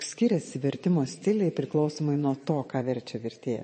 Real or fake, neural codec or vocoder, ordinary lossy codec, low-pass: fake; vocoder, 22.05 kHz, 80 mel bands, Vocos; MP3, 32 kbps; 9.9 kHz